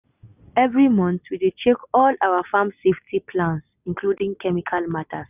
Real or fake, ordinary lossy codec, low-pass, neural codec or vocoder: real; none; 3.6 kHz; none